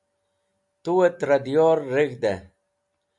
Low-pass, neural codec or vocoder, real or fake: 10.8 kHz; none; real